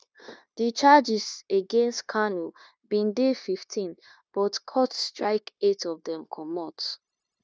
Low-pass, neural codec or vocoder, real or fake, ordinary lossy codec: none; codec, 16 kHz, 0.9 kbps, LongCat-Audio-Codec; fake; none